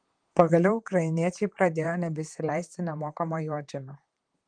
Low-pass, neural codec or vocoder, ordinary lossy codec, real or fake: 9.9 kHz; vocoder, 44.1 kHz, 128 mel bands, Pupu-Vocoder; Opus, 24 kbps; fake